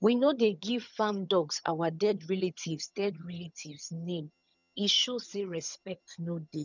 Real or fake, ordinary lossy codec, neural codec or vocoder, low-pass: fake; none; vocoder, 22.05 kHz, 80 mel bands, HiFi-GAN; 7.2 kHz